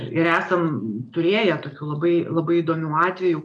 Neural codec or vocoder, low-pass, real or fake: none; 10.8 kHz; real